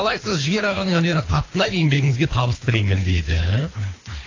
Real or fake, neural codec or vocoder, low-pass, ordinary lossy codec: fake; codec, 24 kHz, 3 kbps, HILCodec; 7.2 kHz; AAC, 32 kbps